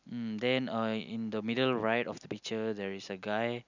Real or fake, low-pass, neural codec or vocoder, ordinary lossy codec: real; 7.2 kHz; none; none